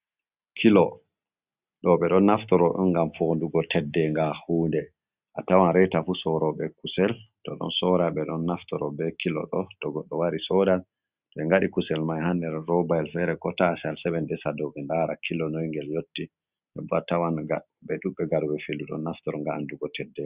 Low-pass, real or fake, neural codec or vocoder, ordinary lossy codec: 3.6 kHz; real; none; Opus, 64 kbps